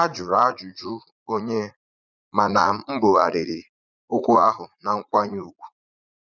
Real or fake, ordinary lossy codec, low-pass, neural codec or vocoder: fake; none; 7.2 kHz; vocoder, 44.1 kHz, 80 mel bands, Vocos